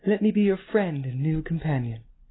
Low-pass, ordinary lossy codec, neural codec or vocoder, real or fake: 7.2 kHz; AAC, 16 kbps; none; real